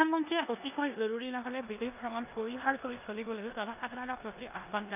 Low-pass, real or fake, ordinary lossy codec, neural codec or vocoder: 3.6 kHz; fake; none; codec, 16 kHz in and 24 kHz out, 0.9 kbps, LongCat-Audio-Codec, four codebook decoder